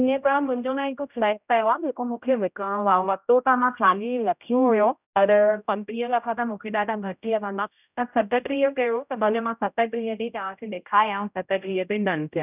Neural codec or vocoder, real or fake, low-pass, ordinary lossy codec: codec, 16 kHz, 0.5 kbps, X-Codec, HuBERT features, trained on general audio; fake; 3.6 kHz; none